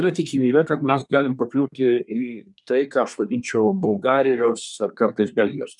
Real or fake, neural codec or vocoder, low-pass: fake; codec, 24 kHz, 1 kbps, SNAC; 10.8 kHz